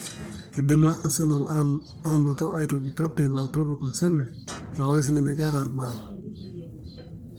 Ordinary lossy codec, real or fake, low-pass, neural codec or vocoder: none; fake; none; codec, 44.1 kHz, 1.7 kbps, Pupu-Codec